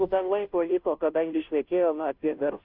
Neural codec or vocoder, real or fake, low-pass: codec, 16 kHz, 0.5 kbps, FunCodec, trained on Chinese and English, 25 frames a second; fake; 5.4 kHz